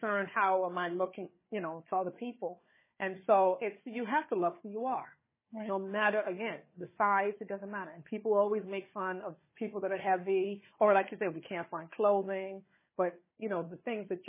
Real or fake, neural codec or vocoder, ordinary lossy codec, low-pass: fake; codec, 16 kHz, 4 kbps, FunCodec, trained on Chinese and English, 50 frames a second; MP3, 16 kbps; 3.6 kHz